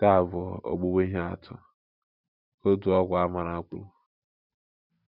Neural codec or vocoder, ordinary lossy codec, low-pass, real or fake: none; none; 5.4 kHz; real